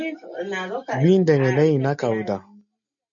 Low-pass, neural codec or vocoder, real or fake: 7.2 kHz; none; real